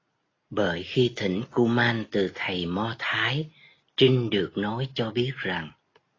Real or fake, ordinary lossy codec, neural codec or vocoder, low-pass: real; AAC, 32 kbps; none; 7.2 kHz